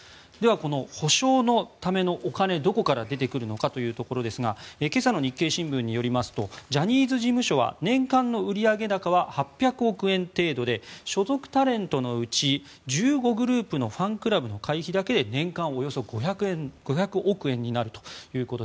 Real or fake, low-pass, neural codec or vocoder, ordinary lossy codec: real; none; none; none